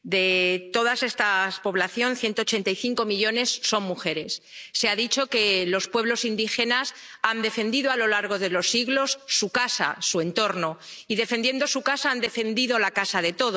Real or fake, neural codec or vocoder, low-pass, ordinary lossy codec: real; none; none; none